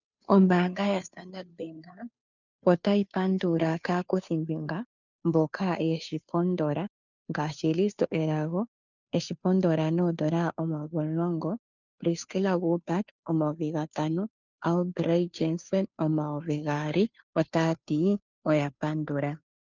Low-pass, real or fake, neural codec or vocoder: 7.2 kHz; fake; codec, 16 kHz, 2 kbps, FunCodec, trained on Chinese and English, 25 frames a second